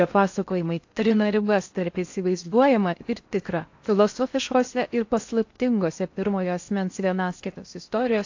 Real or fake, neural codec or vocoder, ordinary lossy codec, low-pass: fake; codec, 16 kHz in and 24 kHz out, 0.6 kbps, FocalCodec, streaming, 4096 codes; AAC, 48 kbps; 7.2 kHz